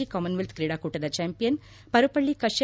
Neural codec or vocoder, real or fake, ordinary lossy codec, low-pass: none; real; none; none